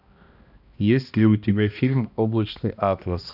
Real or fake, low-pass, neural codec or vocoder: fake; 5.4 kHz; codec, 16 kHz, 1 kbps, X-Codec, HuBERT features, trained on balanced general audio